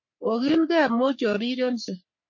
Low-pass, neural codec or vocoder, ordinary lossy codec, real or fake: 7.2 kHz; codec, 44.1 kHz, 3.4 kbps, Pupu-Codec; MP3, 32 kbps; fake